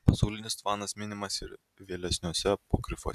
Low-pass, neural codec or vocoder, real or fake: 14.4 kHz; none; real